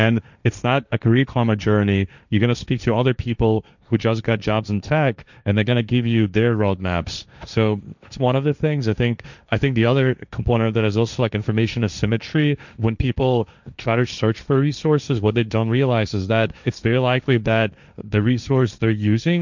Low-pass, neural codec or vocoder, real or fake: 7.2 kHz; codec, 16 kHz, 1.1 kbps, Voila-Tokenizer; fake